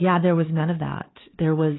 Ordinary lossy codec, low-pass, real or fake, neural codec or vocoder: AAC, 16 kbps; 7.2 kHz; fake; codec, 16 kHz, 4 kbps, X-Codec, HuBERT features, trained on LibriSpeech